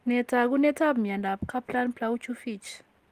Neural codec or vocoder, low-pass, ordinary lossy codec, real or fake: none; 14.4 kHz; Opus, 24 kbps; real